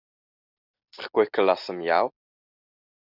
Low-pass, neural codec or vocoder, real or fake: 5.4 kHz; none; real